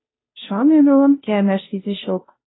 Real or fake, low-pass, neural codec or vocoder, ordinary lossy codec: fake; 7.2 kHz; codec, 16 kHz, 0.5 kbps, FunCodec, trained on Chinese and English, 25 frames a second; AAC, 16 kbps